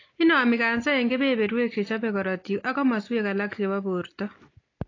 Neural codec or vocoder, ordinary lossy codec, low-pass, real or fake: none; AAC, 32 kbps; 7.2 kHz; real